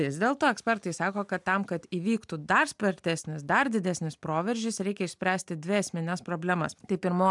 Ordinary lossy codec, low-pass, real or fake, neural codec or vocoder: MP3, 96 kbps; 10.8 kHz; real; none